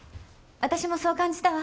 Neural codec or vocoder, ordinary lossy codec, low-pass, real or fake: none; none; none; real